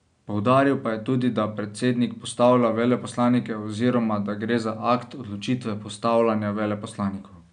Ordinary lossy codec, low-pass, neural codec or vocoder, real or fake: none; 9.9 kHz; none; real